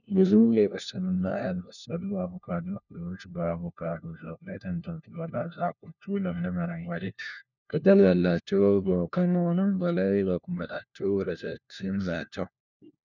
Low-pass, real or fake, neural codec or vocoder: 7.2 kHz; fake; codec, 16 kHz, 1 kbps, FunCodec, trained on LibriTTS, 50 frames a second